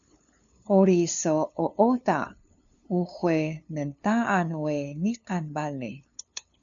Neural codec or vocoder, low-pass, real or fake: codec, 16 kHz, 2 kbps, FunCodec, trained on LibriTTS, 25 frames a second; 7.2 kHz; fake